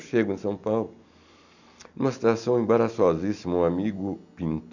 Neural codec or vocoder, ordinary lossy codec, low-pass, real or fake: none; none; 7.2 kHz; real